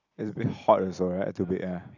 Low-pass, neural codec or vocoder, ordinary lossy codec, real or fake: 7.2 kHz; none; none; real